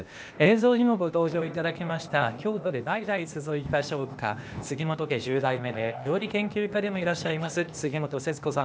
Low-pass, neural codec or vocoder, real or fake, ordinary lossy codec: none; codec, 16 kHz, 0.8 kbps, ZipCodec; fake; none